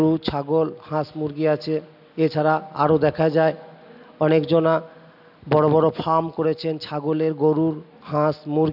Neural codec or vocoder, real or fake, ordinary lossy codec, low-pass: none; real; AAC, 48 kbps; 5.4 kHz